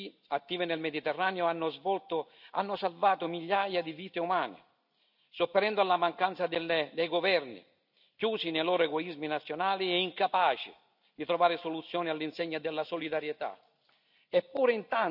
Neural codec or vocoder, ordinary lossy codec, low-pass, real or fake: none; none; 5.4 kHz; real